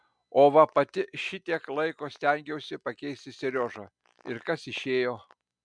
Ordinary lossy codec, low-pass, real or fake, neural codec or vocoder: MP3, 96 kbps; 9.9 kHz; real; none